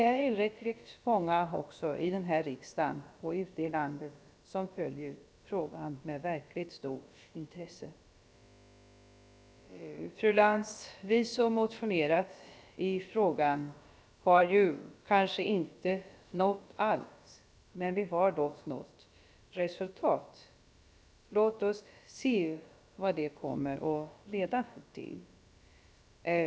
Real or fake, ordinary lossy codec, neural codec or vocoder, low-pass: fake; none; codec, 16 kHz, about 1 kbps, DyCAST, with the encoder's durations; none